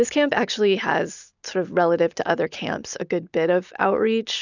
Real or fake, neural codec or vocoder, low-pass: real; none; 7.2 kHz